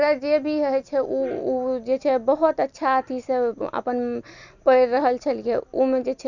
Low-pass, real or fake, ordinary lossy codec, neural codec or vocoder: 7.2 kHz; real; none; none